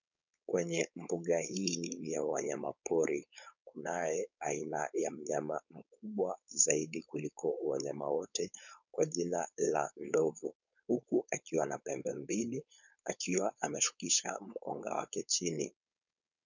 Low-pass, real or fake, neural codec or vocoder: 7.2 kHz; fake; codec, 16 kHz, 4.8 kbps, FACodec